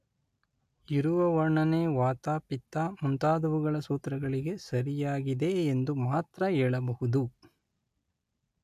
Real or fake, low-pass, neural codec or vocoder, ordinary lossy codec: real; 14.4 kHz; none; AAC, 96 kbps